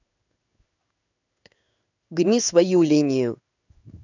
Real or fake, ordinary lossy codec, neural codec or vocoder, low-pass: fake; none; codec, 16 kHz in and 24 kHz out, 1 kbps, XY-Tokenizer; 7.2 kHz